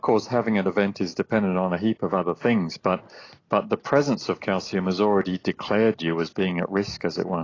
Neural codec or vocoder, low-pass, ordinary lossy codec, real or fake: none; 7.2 kHz; AAC, 32 kbps; real